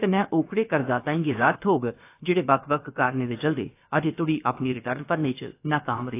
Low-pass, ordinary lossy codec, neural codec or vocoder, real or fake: 3.6 kHz; AAC, 24 kbps; codec, 16 kHz, about 1 kbps, DyCAST, with the encoder's durations; fake